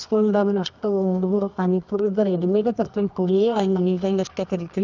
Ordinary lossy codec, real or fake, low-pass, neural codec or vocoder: none; fake; 7.2 kHz; codec, 24 kHz, 0.9 kbps, WavTokenizer, medium music audio release